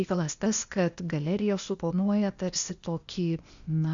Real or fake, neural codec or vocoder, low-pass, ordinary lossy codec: fake; codec, 16 kHz, 0.8 kbps, ZipCodec; 7.2 kHz; Opus, 64 kbps